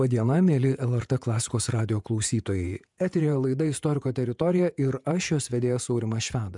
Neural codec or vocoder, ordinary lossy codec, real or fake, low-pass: vocoder, 48 kHz, 128 mel bands, Vocos; MP3, 96 kbps; fake; 10.8 kHz